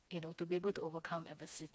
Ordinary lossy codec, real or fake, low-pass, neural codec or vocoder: none; fake; none; codec, 16 kHz, 2 kbps, FreqCodec, smaller model